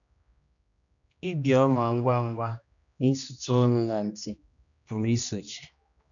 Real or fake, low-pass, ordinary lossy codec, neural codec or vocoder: fake; 7.2 kHz; none; codec, 16 kHz, 1 kbps, X-Codec, HuBERT features, trained on general audio